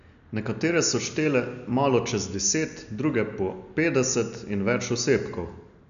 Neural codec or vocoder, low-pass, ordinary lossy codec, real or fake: none; 7.2 kHz; none; real